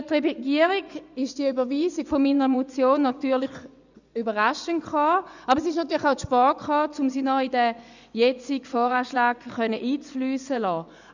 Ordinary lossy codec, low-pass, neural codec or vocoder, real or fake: none; 7.2 kHz; vocoder, 24 kHz, 100 mel bands, Vocos; fake